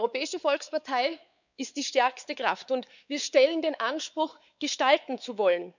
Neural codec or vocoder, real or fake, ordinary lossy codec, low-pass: codec, 16 kHz, 4 kbps, X-Codec, WavLM features, trained on Multilingual LibriSpeech; fake; none; 7.2 kHz